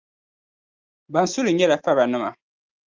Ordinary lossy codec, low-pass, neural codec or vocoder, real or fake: Opus, 24 kbps; 7.2 kHz; none; real